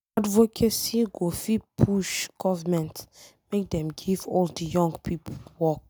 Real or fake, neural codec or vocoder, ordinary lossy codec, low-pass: real; none; none; none